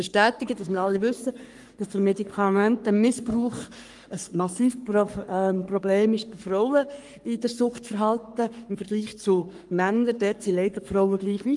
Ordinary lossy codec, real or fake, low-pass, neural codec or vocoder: Opus, 32 kbps; fake; 10.8 kHz; codec, 44.1 kHz, 3.4 kbps, Pupu-Codec